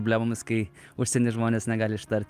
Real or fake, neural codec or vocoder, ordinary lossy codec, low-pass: real; none; Opus, 32 kbps; 14.4 kHz